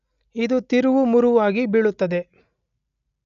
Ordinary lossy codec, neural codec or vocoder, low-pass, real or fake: none; none; 7.2 kHz; real